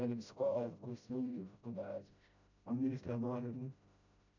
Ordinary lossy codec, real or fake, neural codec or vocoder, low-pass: none; fake; codec, 16 kHz, 0.5 kbps, FreqCodec, smaller model; 7.2 kHz